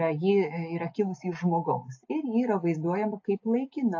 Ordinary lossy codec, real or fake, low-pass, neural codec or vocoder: AAC, 48 kbps; real; 7.2 kHz; none